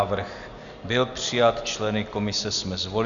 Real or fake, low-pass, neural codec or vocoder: real; 7.2 kHz; none